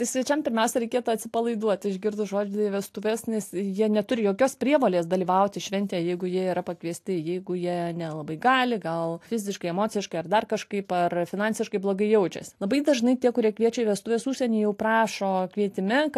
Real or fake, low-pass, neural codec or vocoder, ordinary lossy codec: real; 14.4 kHz; none; AAC, 64 kbps